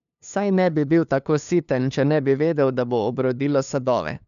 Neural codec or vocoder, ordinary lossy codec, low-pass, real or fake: codec, 16 kHz, 2 kbps, FunCodec, trained on LibriTTS, 25 frames a second; none; 7.2 kHz; fake